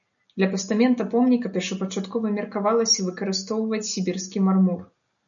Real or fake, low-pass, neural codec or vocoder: real; 7.2 kHz; none